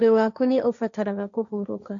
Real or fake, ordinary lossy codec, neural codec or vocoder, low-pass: fake; none; codec, 16 kHz, 1.1 kbps, Voila-Tokenizer; 7.2 kHz